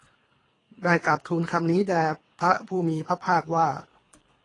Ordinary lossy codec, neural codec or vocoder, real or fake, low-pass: AAC, 32 kbps; codec, 24 kHz, 3 kbps, HILCodec; fake; 10.8 kHz